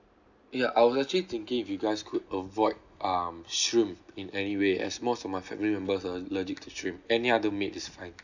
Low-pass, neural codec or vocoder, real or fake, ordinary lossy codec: 7.2 kHz; none; real; none